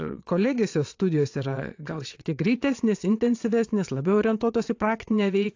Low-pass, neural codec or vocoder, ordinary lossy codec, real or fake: 7.2 kHz; vocoder, 22.05 kHz, 80 mel bands, WaveNeXt; AAC, 48 kbps; fake